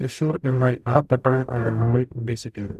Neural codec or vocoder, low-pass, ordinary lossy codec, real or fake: codec, 44.1 kHz, 0.9 kbps, DAC; 14.4 kHz; none; fake